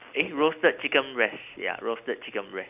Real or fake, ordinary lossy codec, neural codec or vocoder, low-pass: real; none; none; 3.6 kHz